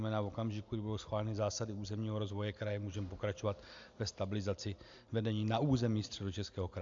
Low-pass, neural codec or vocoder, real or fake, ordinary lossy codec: 7.2 kHz; none; real; AAC, 64 kbps